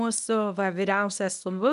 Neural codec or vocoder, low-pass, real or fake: codec, 24 kHz, 0.9 kbps, WavTokenizer, medium speech release version 1; 10.8 kHz; fake